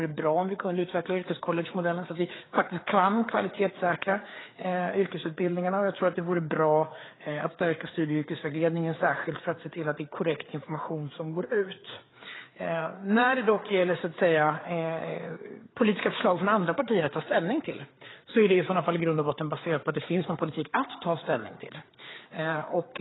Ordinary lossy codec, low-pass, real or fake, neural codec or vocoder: AAC, 16 kbps; 7.2 kHz; fake; codec, 16 kHz, 4 kbps, FreqCodec, larger model